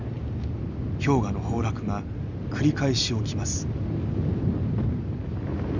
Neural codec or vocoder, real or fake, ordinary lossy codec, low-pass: none; real; none; 7.2 kHz